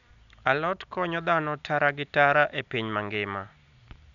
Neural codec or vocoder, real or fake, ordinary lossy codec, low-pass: none; real; none; 7.2 kHz